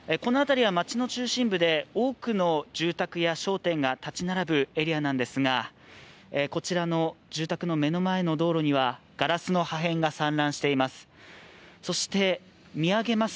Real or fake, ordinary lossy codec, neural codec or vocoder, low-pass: real; none; none; none